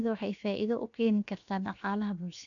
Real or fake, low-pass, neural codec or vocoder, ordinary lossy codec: fake; 7.2 kHz; codec, 16 kHz, 0.7 kbps, FocalCodec; none